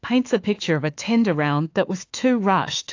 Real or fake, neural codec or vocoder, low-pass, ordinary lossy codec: fake; autoencoder, 48 kHz, 32 numbers a frame, DAC-VAE, trained on Japanese speech; 7.2 kHz; AAC, 48 kbps